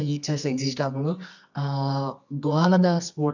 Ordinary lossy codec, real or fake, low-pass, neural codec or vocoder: none; fake; 7.2 kHz; codec, 24 kHz, 0.9 kbps, WavTokenizer, medium music audio release